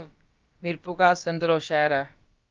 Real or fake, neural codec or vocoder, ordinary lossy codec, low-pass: fake; codec, 16 kHz, about 1 kbps, DyCAST, with the encoder's durations; Opus, 32 kbps; 7.2 kHz